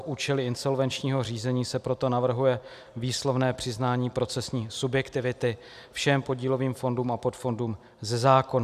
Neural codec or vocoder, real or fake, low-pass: none; real; 14.4 kHz